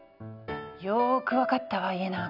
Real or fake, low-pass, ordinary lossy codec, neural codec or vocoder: real; 5.4 kHz; none; none